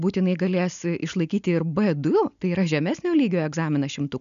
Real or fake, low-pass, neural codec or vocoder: real; 7.2 kHz; none